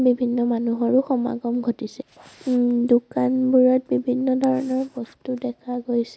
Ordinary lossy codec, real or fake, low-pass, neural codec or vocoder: none; real; none; none